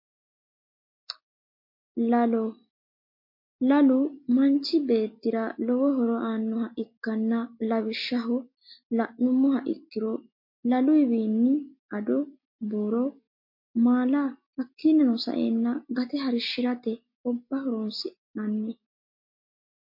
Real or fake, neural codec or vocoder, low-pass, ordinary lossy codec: real; none; 5.4 kHz; MP3, 32 kbps